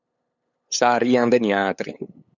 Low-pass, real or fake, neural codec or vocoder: 7.2 kHz; fake; codec, 16 kHz, 8 kbps, FunCodec, trained on LibriTTS, 25 frames a second